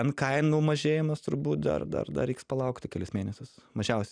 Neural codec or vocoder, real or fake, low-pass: none; real; 9.9 kHz